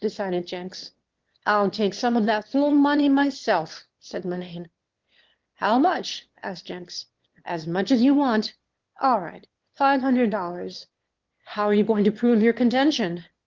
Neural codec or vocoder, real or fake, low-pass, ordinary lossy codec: autoencoder, 22.05 kHz, a latent of 192 numbers a frame, VITS, trained on one speaker; fake; 7.2 kHz; Opus, 16 kbps